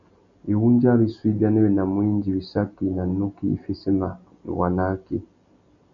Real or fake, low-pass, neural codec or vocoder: real; 7.2 kHz; none